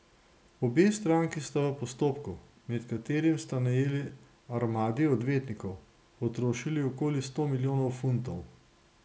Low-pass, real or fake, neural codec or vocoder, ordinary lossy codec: none; real; none; none